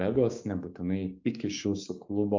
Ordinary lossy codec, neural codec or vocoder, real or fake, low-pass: MP3, 48 kbps; codec, 16 kHz, 6 kbps, DAC; fake; 7.2 kHz